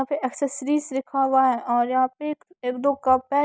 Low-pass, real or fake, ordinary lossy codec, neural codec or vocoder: none; real; none; none